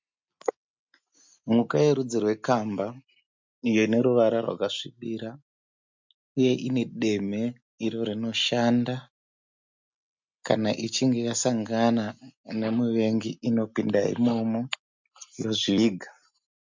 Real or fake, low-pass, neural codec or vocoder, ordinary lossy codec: real; 7.2 kHz; none; MP3, 64 kbps